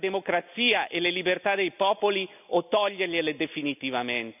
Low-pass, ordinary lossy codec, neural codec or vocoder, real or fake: 3.6 kHz; none; none; real